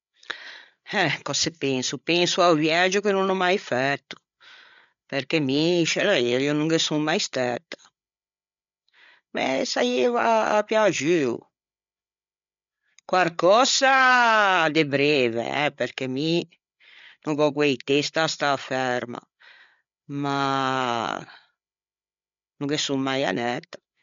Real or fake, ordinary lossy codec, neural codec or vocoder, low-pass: fake; MP3, 64 kbps; codec, 16 kHz, 8 kbps, FreqCodec, larger model; 7.2 kHz